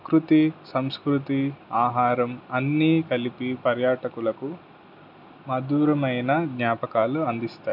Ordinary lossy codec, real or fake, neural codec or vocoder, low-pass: none; real; none; 5.4 kHz